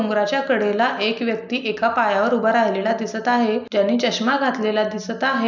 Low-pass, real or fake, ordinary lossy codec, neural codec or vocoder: 7.2 kHz; real; none; none